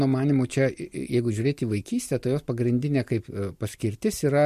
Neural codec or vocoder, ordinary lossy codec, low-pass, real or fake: none; MP3, 64 kbps; 14.4 kHz; real